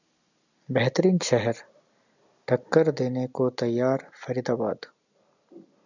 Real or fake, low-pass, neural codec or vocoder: real; 7.2 kHz; none